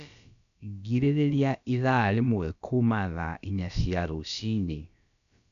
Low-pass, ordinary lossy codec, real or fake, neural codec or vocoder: 7.2 kHz; none; fake; codec, 16 kHz, about 1 kbps, DyCAST, with the encoder's durations